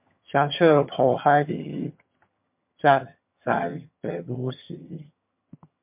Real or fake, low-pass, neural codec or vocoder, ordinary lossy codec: fake; 3.6 kHz; vocoder, 22.05 kHz, 80 mel bands, HiFi-GAN; MP3, 32 kbps